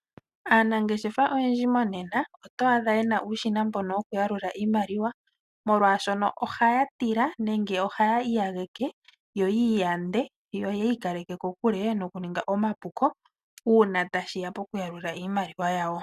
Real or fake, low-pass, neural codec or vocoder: real; 14.4 kHz; none